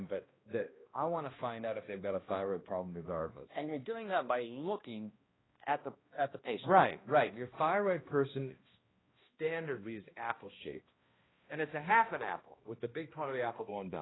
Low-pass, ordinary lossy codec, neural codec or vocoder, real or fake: 7.2 kHz; AAC, 16 kbps; codec, 16 kHz, 1 kbps, X-Codec, HuBERT features, trained on balanced general audio; fake